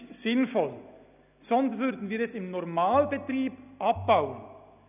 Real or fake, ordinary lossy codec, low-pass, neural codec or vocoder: real; none; 3.6 kHz; none